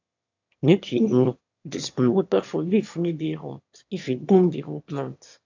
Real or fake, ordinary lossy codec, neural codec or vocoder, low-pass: fake; AAC, 48 kbps; autoencoder, 22.05 kHz, a latent of 192 numbers a frame, VITS, trained on one speaker; 7.2 kHz